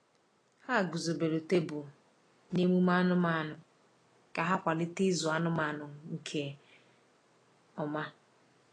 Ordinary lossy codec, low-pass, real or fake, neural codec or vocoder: AAC, 32 kbps; 9.9 kHz; real; none